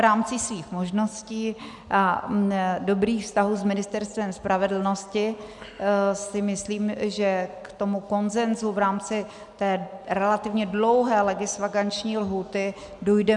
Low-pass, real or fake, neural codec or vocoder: 10.8 kHz; real; none